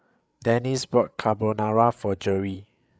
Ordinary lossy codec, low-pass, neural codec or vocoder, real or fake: none; none; codec, 16 kHz, 8 kbps, FreqCodec, larger model; fake